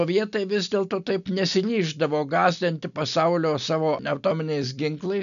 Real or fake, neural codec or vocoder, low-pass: real; none; 7.2 kHz